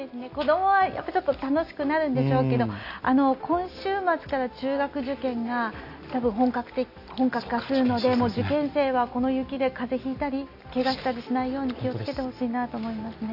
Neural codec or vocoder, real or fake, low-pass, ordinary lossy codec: none; real; 5.4 kHz; none